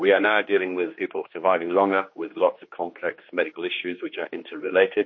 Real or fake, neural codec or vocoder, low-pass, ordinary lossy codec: fake; codec, 16 kHz, 2 kbps, X-Codec, HuBERT features, trained on general audio; 7.2 kHz; MP3, 32 kbps